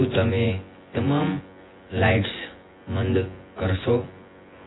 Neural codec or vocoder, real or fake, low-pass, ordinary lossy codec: vocoder, 24 kHz, 100 mel bands, Vocos; fake; 7.2 kHz; AAC, 16 kbps